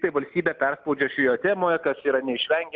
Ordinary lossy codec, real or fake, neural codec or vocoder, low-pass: Opus, 24 kbps; real; none; 7.2 kHz